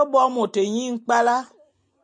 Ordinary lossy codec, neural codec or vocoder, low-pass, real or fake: MP3, 96 kbps; none; 9.9 kHz; real